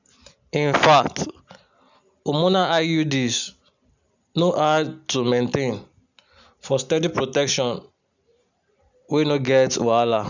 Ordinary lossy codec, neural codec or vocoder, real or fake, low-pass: none; none; real; 7.2 kHz